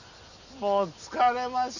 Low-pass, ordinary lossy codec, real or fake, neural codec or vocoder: 7.2 kHz; none; real; none